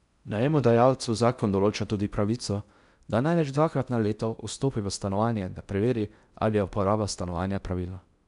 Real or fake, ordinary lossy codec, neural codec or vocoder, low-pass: fake; none; codec, 16 kHz in and 24 kHz out, 0.6 kbps, FocalCodec, streaming, 4096 codes; 10.8 kHz